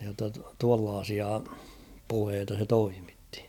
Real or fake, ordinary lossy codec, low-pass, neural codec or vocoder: real; none; 19.8 kHz; none